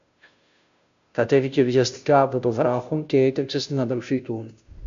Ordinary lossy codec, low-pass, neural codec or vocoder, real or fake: MP3, 64 kbps; 7.2 kHz; codec, 16 kHz, 0.5 kbps, FunCodec, trained on Chinese and English, 25 frames a second; fake